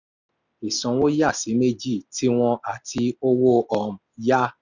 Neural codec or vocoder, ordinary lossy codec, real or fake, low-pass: none; none; real; 7.2 kHz